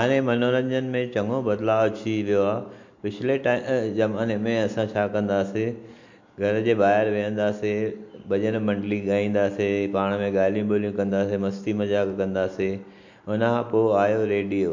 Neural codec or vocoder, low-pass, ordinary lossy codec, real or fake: none; 7.2 kHz; MP3, 48 kbps; real